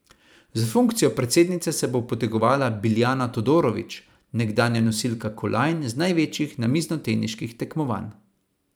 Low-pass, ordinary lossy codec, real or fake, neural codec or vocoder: none; none; fake; vocoder, 44.1 kHz, 128 mel bands every 512 samples, BigVGAN v2